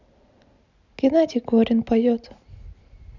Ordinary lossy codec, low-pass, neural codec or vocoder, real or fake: none; 7.2 kHz; none; real